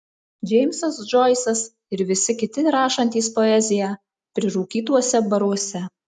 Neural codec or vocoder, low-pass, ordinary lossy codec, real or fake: none; 10.8 kHz; MP3, 96 kbps; real